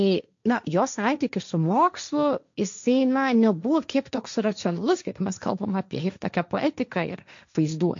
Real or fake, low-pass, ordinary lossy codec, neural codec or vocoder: fake; 7.2 kHz; MP3, 64 kbps; codec, 16 kHz, 1.1 kbps, Voila-Tokenizer